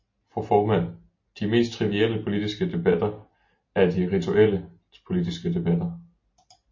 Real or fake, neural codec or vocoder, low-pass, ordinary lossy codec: real; none; 7.2 kHz; MP3, 32 kbps